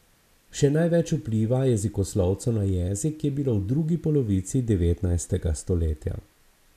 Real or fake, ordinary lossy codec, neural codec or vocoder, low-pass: real; none; none; 14.4 kHz